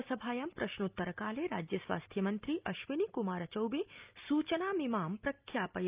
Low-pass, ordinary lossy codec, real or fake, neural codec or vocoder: 3.6 kHz; Opus, 24 kbps; real; none